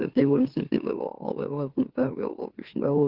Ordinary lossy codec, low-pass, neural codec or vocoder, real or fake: Opus, 16 kbps; 5.4 kHz; autoencoder, 44.1 kHz, a latent of 192 numbers a frame, MeloTTS; fake